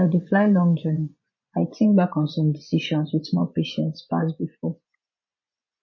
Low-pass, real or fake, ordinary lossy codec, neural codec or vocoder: 7.2 kHz; fake; MP3, 32 kbps; vocoder, 24 kHz, 100 mel bands, Vocos